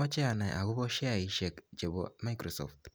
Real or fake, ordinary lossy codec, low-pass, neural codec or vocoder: real; none; none; none